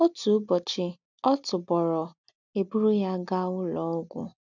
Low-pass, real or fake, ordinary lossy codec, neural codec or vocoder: 7.2 kHz; real; none; none